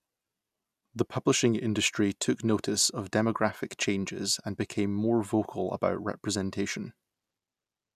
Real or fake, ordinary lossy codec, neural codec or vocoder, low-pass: real; none; none; 14.4 kHz